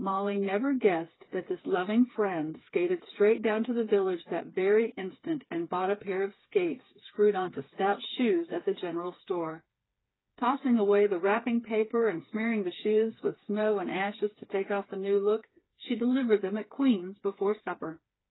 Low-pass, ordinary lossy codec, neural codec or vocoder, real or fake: 7.2 kHz; AAC, 16 kbps; codec, 16 kHz, 4 kbps, FreqCodec, smaller model; fake